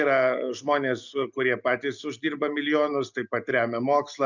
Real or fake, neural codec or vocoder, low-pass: real; none; 7.2 kHz